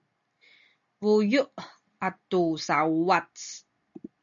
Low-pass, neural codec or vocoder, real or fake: 7.2 kHz; none; real